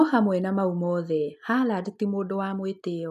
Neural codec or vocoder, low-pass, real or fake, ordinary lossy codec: none; 14.4 kHz; real; none